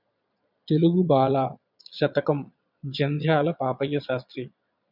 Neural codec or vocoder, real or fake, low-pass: vocoder, 22.05 kHz, 80 mel bands, Vocos; fake; 5.4 kHz